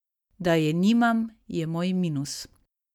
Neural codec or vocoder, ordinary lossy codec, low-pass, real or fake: none; none; 19.8 kHz; real